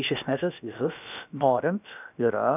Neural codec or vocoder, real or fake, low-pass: codec, 16 kHz, about 1 kbps, DyCAST, with the encoder's durations; fake; 3.6 kHz